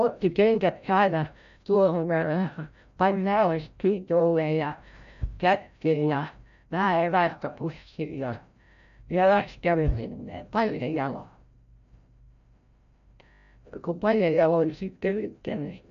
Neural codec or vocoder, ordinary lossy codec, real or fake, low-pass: codec, 16 kHz, 0.5 kbps, FreqCodec, larger model; none; fake; 7.2 kHz